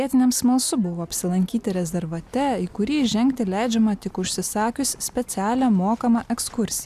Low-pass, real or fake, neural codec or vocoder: 14.4 kHz; real; none